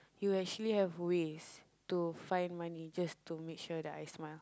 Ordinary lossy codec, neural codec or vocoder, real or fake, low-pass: none; none; real; none